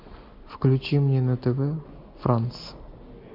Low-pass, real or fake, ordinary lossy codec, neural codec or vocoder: 5.4 kHz; real; MP3, 32 kbps; none